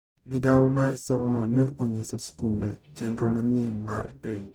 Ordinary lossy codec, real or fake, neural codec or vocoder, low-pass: none; fake; codec, 44.1 kHz, 0.9 kbps, DAC; none